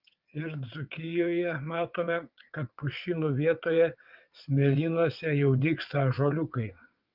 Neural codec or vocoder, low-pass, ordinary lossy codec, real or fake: vocoder, 22.05 kHz, 80 mel bands, WaveNeXt; 5.4 kHz; Opus, 24 kbps; fake